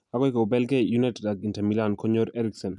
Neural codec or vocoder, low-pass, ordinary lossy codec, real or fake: none; 10.8 kHz; none; real